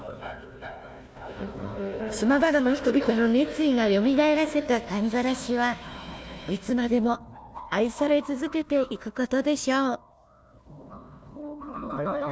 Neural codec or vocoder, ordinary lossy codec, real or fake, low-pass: codec, 16 kHz, 1 kbps, FunCodec, trained on Chinese and English, 50 frames a second; none; fake; none